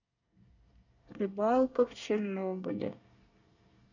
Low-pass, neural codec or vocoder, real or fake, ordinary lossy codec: 7.2 kHz; codec, 24 kHz, 1 kbps, SNAC; fake; none